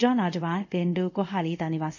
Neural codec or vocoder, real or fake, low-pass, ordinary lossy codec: codec, 24 kHz, 0.5 kbps, DualCodec; fake; 7.2 kHz; none